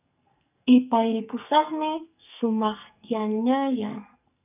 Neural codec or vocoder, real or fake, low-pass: codec, 44.1 kHz, 2.6 kbps, SNAC; fake; 3.6 kHz